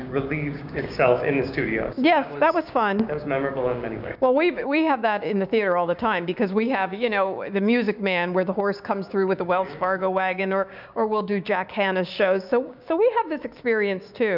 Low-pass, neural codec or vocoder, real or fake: 5.4 kHz; codec, 16 kHz, 6 kbps, DAC; fake